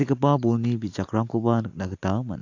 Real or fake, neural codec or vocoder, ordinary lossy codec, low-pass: real; none; AAC, 48 kbps; 7.2 kHz